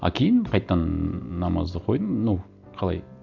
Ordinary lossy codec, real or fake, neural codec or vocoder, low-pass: none; real; none; 7.2 kHz